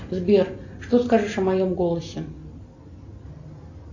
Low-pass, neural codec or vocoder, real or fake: 7.2 kHz; none; real